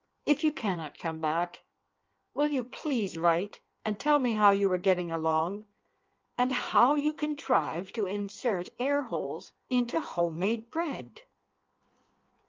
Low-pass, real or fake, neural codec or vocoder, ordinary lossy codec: 7.2 kHz; fake; codec, 16 kHz in and 24 kHz out, 1.1 kbps, FireRedTTS-2 codec; Opus, 24 kbps